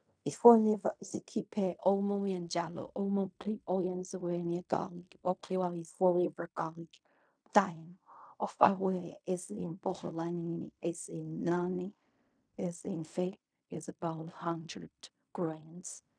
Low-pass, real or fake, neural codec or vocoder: 9.9 kHz; fake; codec, 16 kHz in and 24 kHz out, 0.4 kbps, LongCat-Audio-Codec, fine tuned four codebook decoder